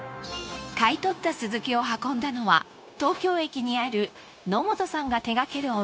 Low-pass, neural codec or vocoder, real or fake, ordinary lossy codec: none; codec, 16 kHz, 0.9 kbps, LongCat-Audio-Codec; fake; none